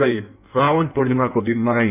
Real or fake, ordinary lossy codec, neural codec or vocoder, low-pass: fake; none; codec, 16 kHz in and 24 kHz out, 1.1 kbps, FireRedTTS-2 codec; 3.6 kHz